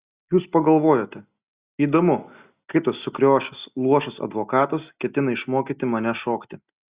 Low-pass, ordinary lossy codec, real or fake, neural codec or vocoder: 3.6 kHz; Opus, 64 kbps; real; none